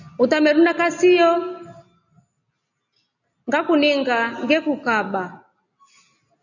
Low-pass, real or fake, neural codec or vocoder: 7.2 kHz; real; none